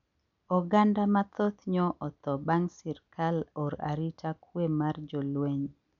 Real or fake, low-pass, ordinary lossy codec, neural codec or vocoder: real; 7.2 kHz; none; none